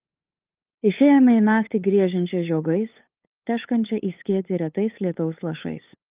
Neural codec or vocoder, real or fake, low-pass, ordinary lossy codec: codec, 16 kHz, 8 kbps, FunCodec, trained on LibriTTS, 25 frames a second; fake; 3.6 kHz; Opus, 24 kbps